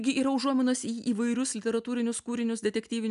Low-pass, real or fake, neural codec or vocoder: 10.8 kHz; real; none